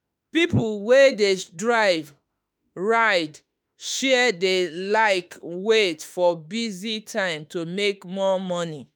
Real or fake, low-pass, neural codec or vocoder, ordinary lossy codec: fake; none; autoencoder, 48 kHz, 32 numbers a frame, DAC-VAE, trained on Japanese speech; none